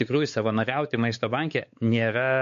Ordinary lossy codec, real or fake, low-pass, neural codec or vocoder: MP3, 48 kbps; fake; 7.2 kHz; codec, 16 kHz, 4 kbps, X-Codec, WavLM features, trained on Multilingual LibriSpeech